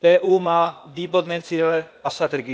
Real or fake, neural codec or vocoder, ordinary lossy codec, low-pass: fake; codec, 16 kHz, 0.8 kbps, ZipCodec; none; none